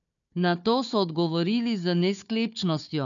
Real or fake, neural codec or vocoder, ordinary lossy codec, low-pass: fake; codec, 16 kHz, 4 kbps, FunCodec, trained on Chinese and English, 50 frames a second; MP3, 96 kbps; 7.2 kHz